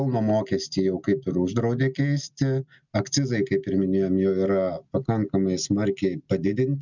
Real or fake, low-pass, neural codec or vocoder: real; 7.2 kHz; none